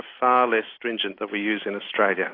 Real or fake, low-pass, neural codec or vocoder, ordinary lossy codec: real; 5.4 kHz; none; AAC, 32 kbps